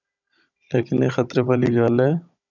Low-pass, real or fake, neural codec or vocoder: 7.2 kHz; fake; codec, 16 kHz, 16 kbps, FunCodec, trained on Chinese and English, 50 frames a second